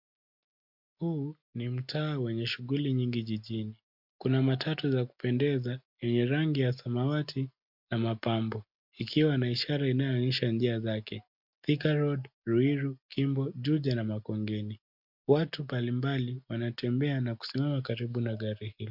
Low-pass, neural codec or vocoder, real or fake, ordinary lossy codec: 5.4 kHz; none; real; MP3, 48 kbps